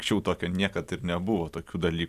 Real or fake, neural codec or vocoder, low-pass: real; none; 14.4 kHz